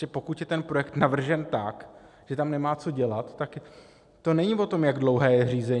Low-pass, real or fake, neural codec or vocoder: 10.8 kHz; real; none